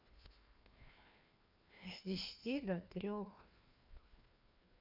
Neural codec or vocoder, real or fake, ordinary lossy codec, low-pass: codec, 16 kHz, 2 kbps, FreqCodec, larger model; fake; none; 5.4 kHz